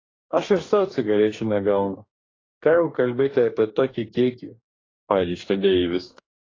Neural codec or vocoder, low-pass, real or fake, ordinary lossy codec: codec, 44.1 kHz, 2.6 kbps, DAC; 7.2 kHz; fake; AAC, 32 kbps